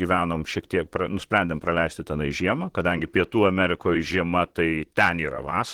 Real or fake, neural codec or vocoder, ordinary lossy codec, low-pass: fake; vocoder, 44.1 kHz, 128 mel bands, Pupu-Vocoder; Opus, 16 kbps; 19.8 kHz